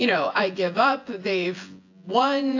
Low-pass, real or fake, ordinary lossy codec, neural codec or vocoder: 7.2 kHz; fake; AAC, 48 kbps; vocoder, 24 kHz, 100 mel bands, Vocos